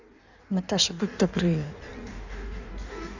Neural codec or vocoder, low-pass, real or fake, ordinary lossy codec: codec, 16 kHz in and 24 kHz out, 1.1 kbps, FireRedTTS-2 codec; 7.2 kHz; fake; none